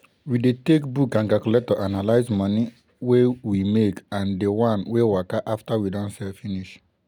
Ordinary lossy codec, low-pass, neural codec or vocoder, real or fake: none; 19.8 kHz; none; real